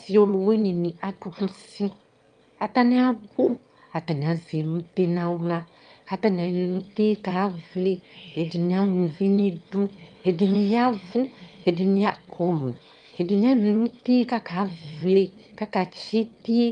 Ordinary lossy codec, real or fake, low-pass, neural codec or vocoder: Opus, 32 kbps; fake; 9.9 kHz; autoencoder, 22.05 kHz, a latent of 192 numbers a frame, VITS, trained on one speaker